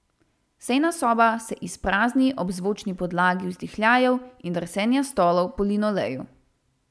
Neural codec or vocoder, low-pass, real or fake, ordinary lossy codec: none; none; real; none